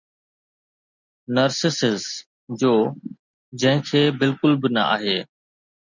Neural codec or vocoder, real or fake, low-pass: none; real; 7.2 kHz